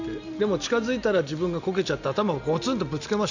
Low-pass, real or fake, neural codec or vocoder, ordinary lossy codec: 7.2 kHz; real; none; none